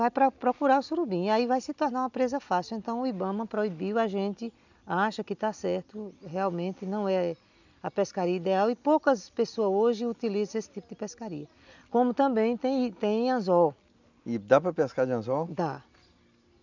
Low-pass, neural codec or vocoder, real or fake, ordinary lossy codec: 7.2 kHz; none; real; none